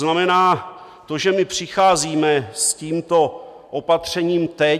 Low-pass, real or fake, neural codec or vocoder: 14.4 kHz; real; none